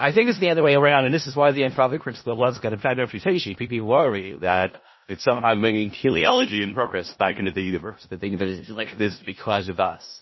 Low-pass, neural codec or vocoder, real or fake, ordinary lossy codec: 7.2 kHz; codec, 16 kHz in and 24 kHz out, 0.4 kbps, LongCat-Audio-Codec, four codebook decoder; fake; MP3, 24 kbps